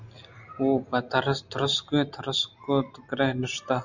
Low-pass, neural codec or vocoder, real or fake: 7.2 kHz; none; real